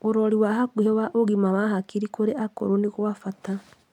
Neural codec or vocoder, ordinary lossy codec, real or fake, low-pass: none; none; real; 19.8 kHz